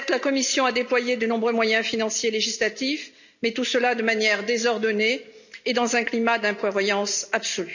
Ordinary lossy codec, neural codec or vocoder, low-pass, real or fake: none; none; 7.2 kHz; real